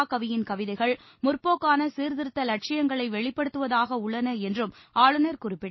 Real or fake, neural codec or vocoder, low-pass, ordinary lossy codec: real; none; 7.2 kHz; MP3, 24 kbps